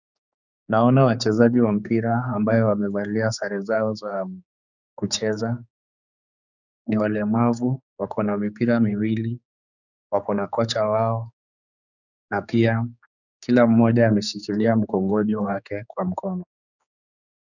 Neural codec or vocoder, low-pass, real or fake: codec, 16 kHz, 4 kbps, X-Codec, HuBERT features, trained on general audio; 7.2 kHz; fake